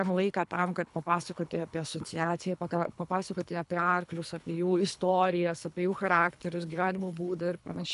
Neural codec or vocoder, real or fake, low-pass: codec, 24 kHz, 3 kbps, HILCodec; fake; 10.8 kHz